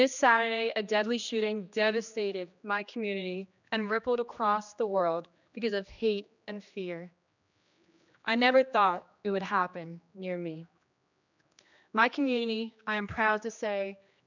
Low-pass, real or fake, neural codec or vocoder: 7.2 kHz; fake; codec, 16 kHz, 2 kbps, X-Codec, HuBERT features, trained on general audio